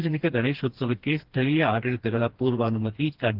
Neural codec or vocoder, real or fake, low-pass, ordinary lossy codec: codec, 16 kHz, 2 kbps, FreqCodec, smaller model; fake; 5.4 kHz; Opus, 16 kbps